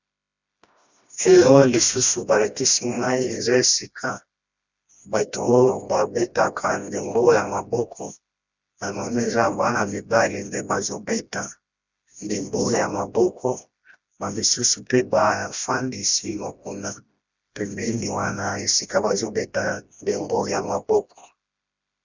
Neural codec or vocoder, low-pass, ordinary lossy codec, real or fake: codec, 16 kHz, 1 kbps, FreqCodec, smaller model; 7.2 kHz; Opus, 64 kbps; fake